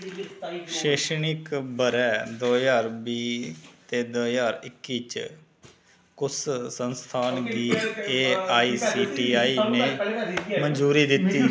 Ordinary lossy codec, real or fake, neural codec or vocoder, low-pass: none; real; none; none